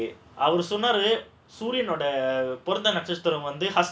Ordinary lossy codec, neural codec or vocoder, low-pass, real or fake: none; none; none; real